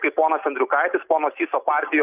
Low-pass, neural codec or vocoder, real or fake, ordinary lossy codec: 3.6 kHz; none; real; Opus, 64 kbps